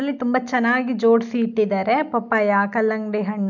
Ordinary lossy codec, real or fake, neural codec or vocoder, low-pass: none; real; none; 7.2 kHz